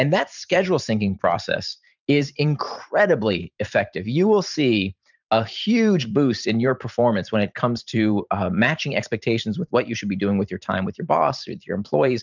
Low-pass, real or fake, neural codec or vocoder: 7.2 kHz; fake; vocoder, 44.1 kHz, 128 mel bands every 512 samples, BigVGAN v2